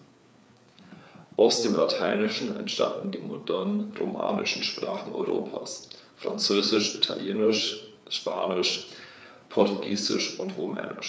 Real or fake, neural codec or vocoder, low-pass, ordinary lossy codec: fake; codec, 16 kHz, 4 kbps, FreqCodec, larger model; none; none